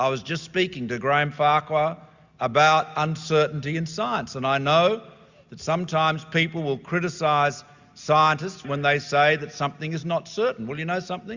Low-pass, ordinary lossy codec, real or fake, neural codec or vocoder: 7.2 kHz; Opus, 64 kbps; real; none